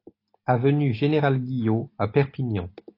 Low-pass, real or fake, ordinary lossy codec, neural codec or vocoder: 5.4 kHz; real; AAC, 32 kbps; none